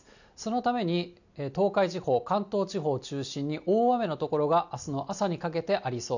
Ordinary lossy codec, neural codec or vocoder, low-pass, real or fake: none; none; 7.2 kHz; real